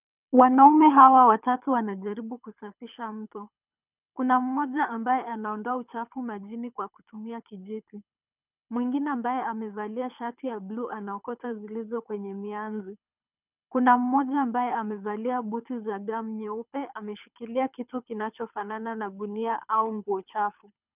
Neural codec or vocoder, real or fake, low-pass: codec, 24 kHz, 6 kbps, HILCodec; fake; 3.6 kHz